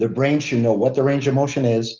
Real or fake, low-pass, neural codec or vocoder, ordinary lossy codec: real; 7.2 kHz; none; Opus, 24 kbps